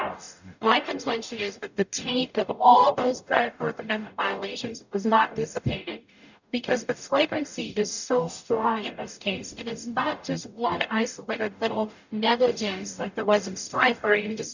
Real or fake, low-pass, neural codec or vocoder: fake; 7.2 kHz; codec, 44.1 kHz, 0.9 kbps, DAC